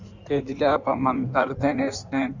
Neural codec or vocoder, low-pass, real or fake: codec, 16 kHz in and 24 kHz out, 1.1 kbps, FireRedTTS-2 codec; 7.2 kHz; fake